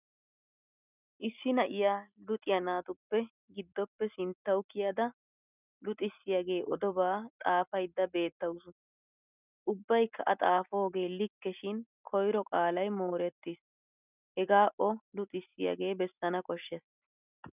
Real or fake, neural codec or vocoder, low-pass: real; none; 3.6 kHz